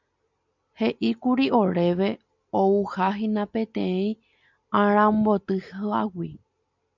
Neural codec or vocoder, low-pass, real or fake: none; 7.2 kHz; real